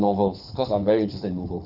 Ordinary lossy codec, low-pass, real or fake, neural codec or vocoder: none; 5.4 kHz; fake; codec, 24 kHz, 6 kbps, HILCodec